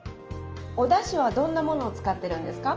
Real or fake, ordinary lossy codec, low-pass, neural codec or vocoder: real; Opus, 24 kbps; 7.2 kHz; none